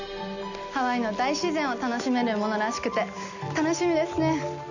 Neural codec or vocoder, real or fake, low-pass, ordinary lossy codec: none; real; 7.2 kHz; none